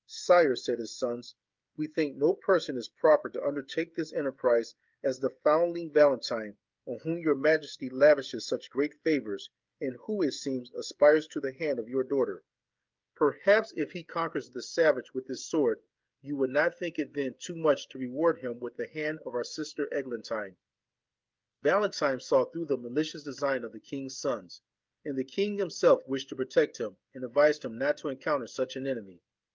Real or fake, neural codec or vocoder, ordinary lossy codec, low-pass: fake; codec, 16 kHz, 16 kbps, FreqCodec, smaller model; Opus, 32 kbps; 7.2 kHz